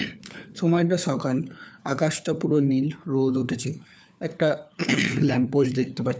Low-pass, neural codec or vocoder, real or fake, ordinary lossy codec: none; codec, 16 kHz, 4 kbps, FunCodec, trained on LibriTTS, 50 frames a second; fake; none